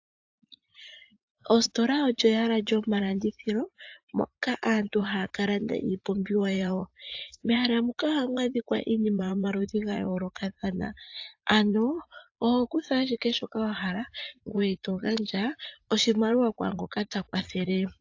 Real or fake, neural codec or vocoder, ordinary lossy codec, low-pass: fake; vocoder, 44.1 kHz, 80 mel bands, Vocos; AAC, 48 kbps; 7.2 kHz